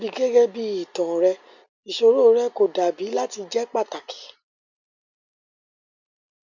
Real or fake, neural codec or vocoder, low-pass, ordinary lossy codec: real; none; 7.2 kHz; none